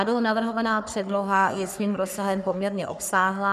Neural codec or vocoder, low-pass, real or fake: codec, 44.1 kHz, 3.4 kbps, Pupu-Codec; 14.4 kHz; fake